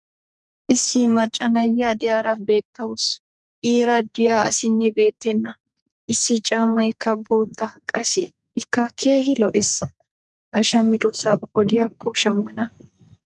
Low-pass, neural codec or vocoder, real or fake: 10.8 kHz; codec, 44.1 kHz, 2.6 kbps, SNAC; fake